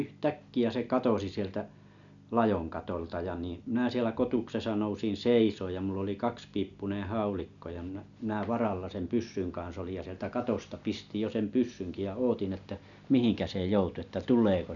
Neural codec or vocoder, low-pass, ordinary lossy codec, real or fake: none; 7.2 kHz; none; real